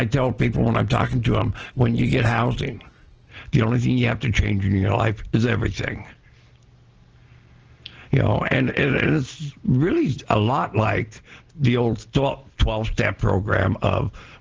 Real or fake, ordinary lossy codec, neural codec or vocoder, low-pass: real; Opus, 16 kbps; none; 7.2 kHz